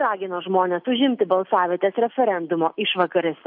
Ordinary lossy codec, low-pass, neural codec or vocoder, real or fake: MP3, 48 kbps; 5.4 kHz; none; real